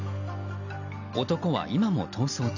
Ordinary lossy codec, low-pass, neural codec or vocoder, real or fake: none; 7.2 kHz; none; real